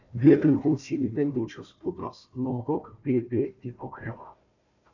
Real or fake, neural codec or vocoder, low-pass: fake; codec, 16 kHz, 1 kbps, FunCodec, trained on Chinese and English, 50 frames a second; 7.2 kHz